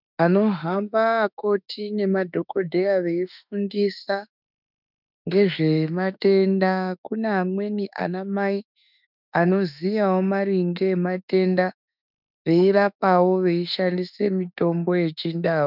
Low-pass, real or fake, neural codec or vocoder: 5.4 kHz; fake; autoencoder, 48 kHz, 32 numbers a frame, DAC-VAE, trained on Japanese speech